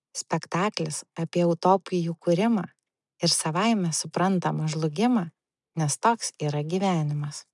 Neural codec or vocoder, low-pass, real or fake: none; 10.8 kHz; real